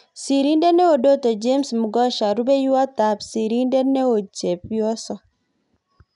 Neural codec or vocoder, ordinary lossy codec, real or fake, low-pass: none; none; real; 10.8 kHz